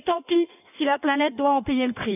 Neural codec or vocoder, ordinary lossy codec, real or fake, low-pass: codec, 16 kHz in and 24 kHz out, 1.1 kbps, FireRedTTS-2 codec; none; fake; 3.6 kHz